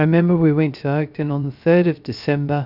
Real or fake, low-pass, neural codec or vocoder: fake; 5.4 kHz; codec, 16 kHz, 0.3 kbps, FocalCodec